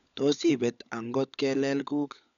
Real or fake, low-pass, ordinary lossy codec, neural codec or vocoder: fake; 7.2 kHz; none; codec, 16 kHz, 16 kbps, FunCodec, trained on LibriTTS, 50 frames a second